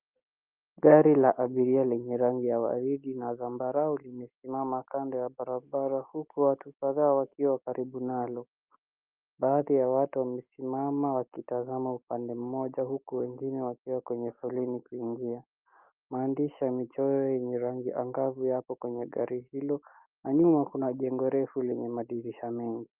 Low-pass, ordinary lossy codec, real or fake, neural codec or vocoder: 3.6 kHz; Opus, 24 kbps; real; none